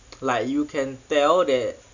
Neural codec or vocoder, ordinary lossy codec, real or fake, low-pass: none; none; real; 7.2 kHz